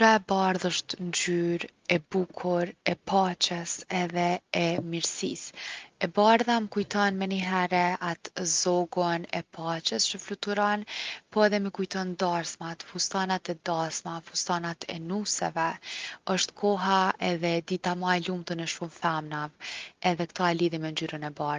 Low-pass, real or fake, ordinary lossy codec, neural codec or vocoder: 7.2 kHz; real; Opus, 32 kbps; none